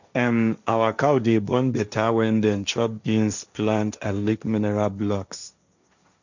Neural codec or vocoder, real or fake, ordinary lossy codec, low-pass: codec, 16 kHz, 1.1 kbps, Voila-Tokenizer; fake; none; 7.2 kHz